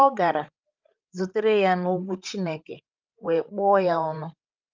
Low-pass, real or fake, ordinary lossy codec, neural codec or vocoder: 7.2 kHz; fake; Opus, 24 kbps; codec, 16 kHz, 8 kbps, FreqCodec, larger model